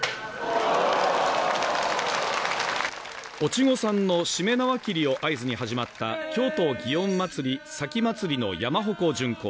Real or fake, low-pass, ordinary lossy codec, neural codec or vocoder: real; none; none; none